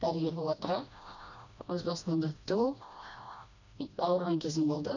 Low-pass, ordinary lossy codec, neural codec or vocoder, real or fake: 7.2 kHz; none; codec, 16 kHz, 1 kbps, FreqCodec, smaller model; fake